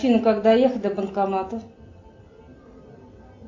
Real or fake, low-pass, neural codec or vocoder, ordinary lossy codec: real; 7.2 kHz; none; AAC, 48 kbps